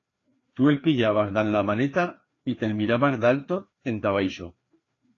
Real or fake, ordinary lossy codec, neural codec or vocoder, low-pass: fake; AAC, 32 kbps; codec, 16 kHz, 2 kbps, FreqCodec, larger model; 7.2 kHz